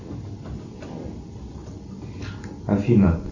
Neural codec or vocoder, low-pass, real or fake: none; 7.2 kHz; real